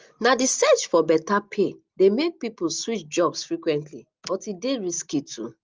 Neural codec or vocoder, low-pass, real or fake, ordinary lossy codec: none; 7.2 kHz; real; Opus, 24 kbps